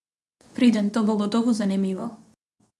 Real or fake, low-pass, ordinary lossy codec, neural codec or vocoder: fake; none; none; codec, 24 kHz, 0.9 kbps, WavTokenizer, medium speech release version 2